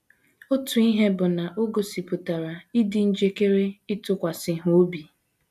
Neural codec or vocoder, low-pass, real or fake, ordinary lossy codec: none; 14.4 kHz; real; none